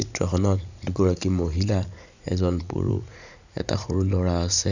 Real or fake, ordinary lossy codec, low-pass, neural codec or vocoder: fake; none; 7.2 kHz; vocoder, 44.1 kHz, 80 mel bands, Vocos